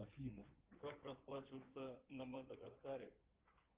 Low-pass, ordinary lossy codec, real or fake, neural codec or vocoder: 3.6 kHz; Opus, 16 kbps; fake; codec, 16 kHz in and 24 kHz out, 2.2 kbps, FireRedTTS-2 codec